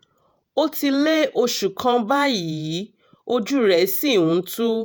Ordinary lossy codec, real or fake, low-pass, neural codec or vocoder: none; fake; none; vocoder, 48 kHz, 128 mel bands, Vocos